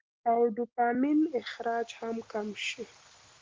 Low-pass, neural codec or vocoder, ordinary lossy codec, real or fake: 7.2 kHz; none; Opus, 16 kbps; real